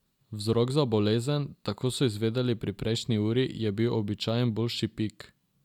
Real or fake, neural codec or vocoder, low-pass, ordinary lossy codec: real; none; 19.8 kHz; none